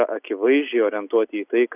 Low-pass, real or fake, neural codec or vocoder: 3.6 kHz; real; none